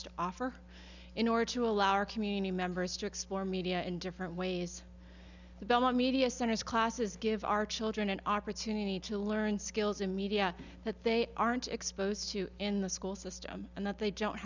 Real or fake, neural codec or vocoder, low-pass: real; none; 7.2 kHz